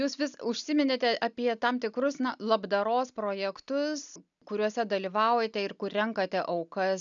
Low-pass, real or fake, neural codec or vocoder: 7.2 kHz; real; none